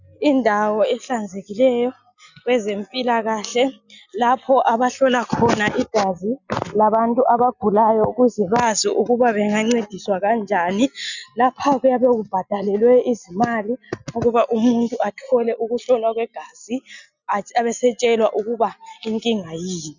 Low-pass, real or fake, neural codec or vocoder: 7.2 kHz; real; none